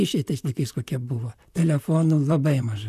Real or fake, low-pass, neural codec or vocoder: fake; 14.4 kHz; vocoder, 44.1 kHz, 128 mel bands, Pupu-Vocoder